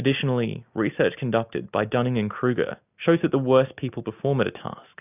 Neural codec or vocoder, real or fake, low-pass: none; real; 3.6 kHz